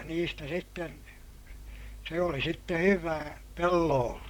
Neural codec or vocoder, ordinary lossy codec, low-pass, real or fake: vocoder, 44.1 kHz, 128 mel bands, Pupu-Vocoder; none; 19.8 kHz; fake